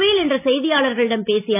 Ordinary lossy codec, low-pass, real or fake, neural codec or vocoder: none; 3.6 kHz; real; none